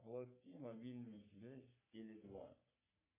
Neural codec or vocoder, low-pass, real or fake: codec, 44.1 kHz, 3.4 kbps, Pupu-Codec; 3.6 kHz; fake